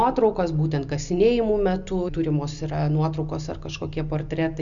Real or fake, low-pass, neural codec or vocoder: real; 7.2 kHz; none